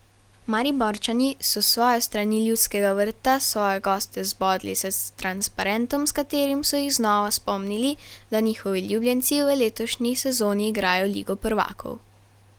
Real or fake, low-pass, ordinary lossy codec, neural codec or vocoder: real; 19.8 kHz; Opus, 24 kbps; none